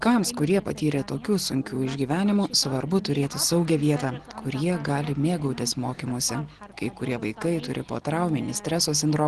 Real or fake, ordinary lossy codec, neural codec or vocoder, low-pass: real; Opus, 16 kbps; none; 10.8 kHz